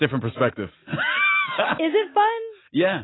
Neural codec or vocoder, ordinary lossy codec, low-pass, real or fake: none; AAC, 16 kbps; 7.2 kHz; real